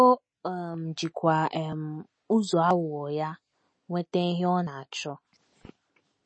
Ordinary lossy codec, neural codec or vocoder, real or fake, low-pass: MP3, 32 kbps; none; real; 9.9 kHz